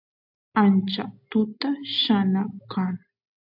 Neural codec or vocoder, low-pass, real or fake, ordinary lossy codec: none; 5.4 kHz; real; AAC, 48 kbps